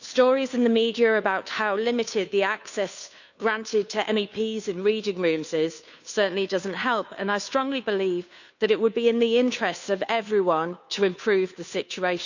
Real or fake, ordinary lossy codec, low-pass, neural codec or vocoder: fake; none; 7.2 kHz; codec, 16 kHz, 2 kbps, FunCodec, trained on Chinese and English, 25 frames a second